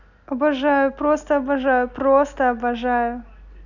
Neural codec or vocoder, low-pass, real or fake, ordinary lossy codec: none; 7.2 kHz; real; none